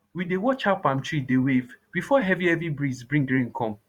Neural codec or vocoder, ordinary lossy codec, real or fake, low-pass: vocoder, 44.1 kHz, 128 mel bands every 512 samples, BigVGAN v2; Opus, 64 kbps; fake; 19.8 kHz